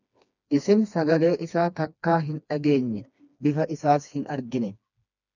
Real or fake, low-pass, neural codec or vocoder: fake; 7.2 kHz; codec, 16 kHz, 2 kbps, FreqCodec, smaller model